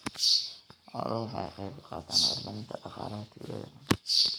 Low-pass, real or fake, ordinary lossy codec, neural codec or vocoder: none; fake; none; codec, 44.1 kHz, 7.8 kbps, Pupu-Codec